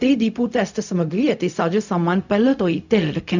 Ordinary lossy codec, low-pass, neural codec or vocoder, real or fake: none; 7.2 kHz; codec, 16 kHz, 0.4 kbps, LongCat-Audio-Codec; fake